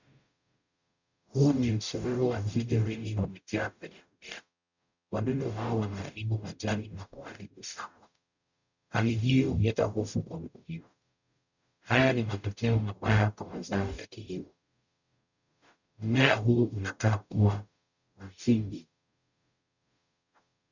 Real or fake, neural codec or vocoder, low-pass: fake; codec, 44.1 kHz, 0.9 kbps, DAC; 7.2 kHz